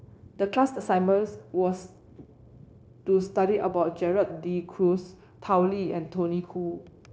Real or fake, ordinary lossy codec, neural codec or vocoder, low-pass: fake; none; codec, 16 kHz, 0.9 kbps, LongCat-Audio-Codec; none